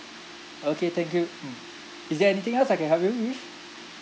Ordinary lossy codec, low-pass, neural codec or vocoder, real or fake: none; none; none; real